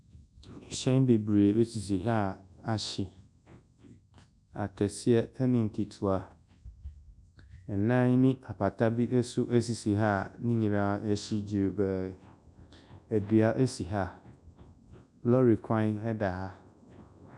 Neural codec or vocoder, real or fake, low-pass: codec, 24 kHz, 0.9 kbps, WavTokenizer, large speech release; fake; 10.8 kHz